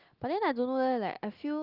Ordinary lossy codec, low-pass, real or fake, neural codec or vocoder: Opus, 32 kbps; 5.4 kHz; real; none